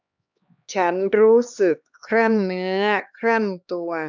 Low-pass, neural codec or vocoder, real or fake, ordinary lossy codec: 7.2 kHz; codec, 16 kHz, 2 kbps, X-Codec, HuBERT features, trained on LibriSpeech; fake; none